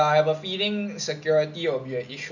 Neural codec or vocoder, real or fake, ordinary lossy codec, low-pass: none; real; none; 7.2 kHz